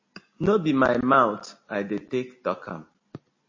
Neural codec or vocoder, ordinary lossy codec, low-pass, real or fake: none; MP3, 32 kbps; 7.2 kHz; real